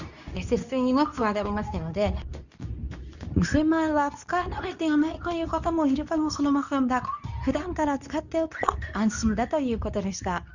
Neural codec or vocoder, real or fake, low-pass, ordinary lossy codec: codec, 24 kHz, 0.9 kbps, WavTokenizer, medium speech release version 2; fake; 7.2 kHz; none